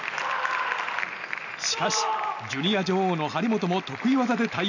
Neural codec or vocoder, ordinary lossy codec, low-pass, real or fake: none; none; 7.2 kHz; real